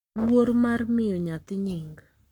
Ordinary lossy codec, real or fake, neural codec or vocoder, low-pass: none; fake; codec, 44.1 kHz, 7.8 kbps, Pupu-Codec; 19.8 kHz